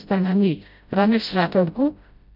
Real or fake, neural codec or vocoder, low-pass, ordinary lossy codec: fake; codec, 16 kHz, 0.5 kbps, FreqCodec, smaller model; 5.4 kHz; none